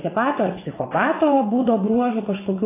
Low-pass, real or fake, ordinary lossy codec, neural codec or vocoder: 3.6 kHz; fake; AAC, 16 kbps; vocoder, 22.05 kHz, 80 mel bands, WaveNeXt